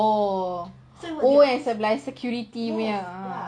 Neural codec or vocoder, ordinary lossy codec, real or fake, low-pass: none; none; real; 9.9 kHz